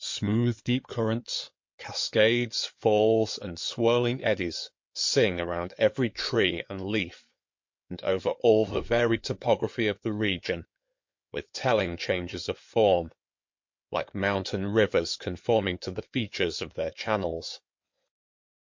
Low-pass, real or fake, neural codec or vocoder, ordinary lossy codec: 7.2 kHz; fake; codec, 16 kHz in and 24 kHz out, 2.2 kbps, FireRedTTS-2 codec; MP3, 48 kbps